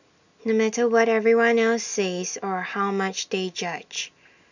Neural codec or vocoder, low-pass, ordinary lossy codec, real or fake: none; 7.2 kHz; none; real